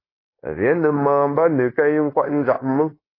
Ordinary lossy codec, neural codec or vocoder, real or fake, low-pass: AAC, 24 kbps; codec, 16 kHz, 0.9 kbps, LongCat-Audio-Codec; fake; 5.4 kHz